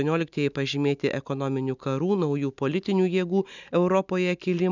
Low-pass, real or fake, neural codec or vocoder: 7.2 kHz; real; none